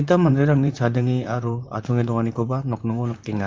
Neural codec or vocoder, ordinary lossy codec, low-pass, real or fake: vocoder, 44.1 kHz, 128 mel bands, Pupu-Vocoder; Opus, 24 kbps; 7.2 kHz; fake